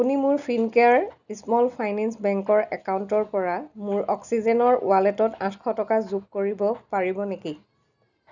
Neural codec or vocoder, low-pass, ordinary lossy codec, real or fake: none; 7.2 kHz; none; real